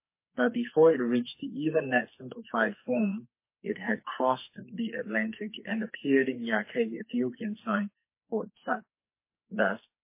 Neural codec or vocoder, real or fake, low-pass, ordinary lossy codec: codec, 44.1 kHz, 2.6 kbps, SNAC; fake; 3.6 kHz; MP3, 24 kbps